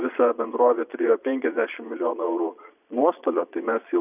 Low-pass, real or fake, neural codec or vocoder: 3.6 kHz; fake; vocoder, 22.05 kHz, 80 mel bands, Vocos